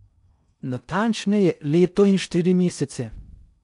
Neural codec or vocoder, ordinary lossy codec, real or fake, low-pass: codec, 16 kHz in and 24 kHz out, 0.6 kbps, FocalCodec, streaming, 4096 codes; none; fake; 10.8 kHz